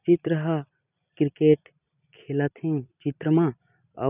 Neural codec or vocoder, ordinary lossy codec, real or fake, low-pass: vocoder, 44.1 kHz, 128 mel bands every 256 samples, BigVGAN v2; none; fake; 3.6 kHz